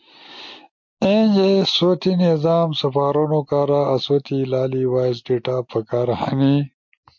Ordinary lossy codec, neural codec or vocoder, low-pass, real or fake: MP3, 48 kbps; none; 7.2 kHz; real